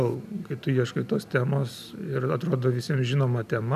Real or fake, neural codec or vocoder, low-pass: real; none; 14.4 kHz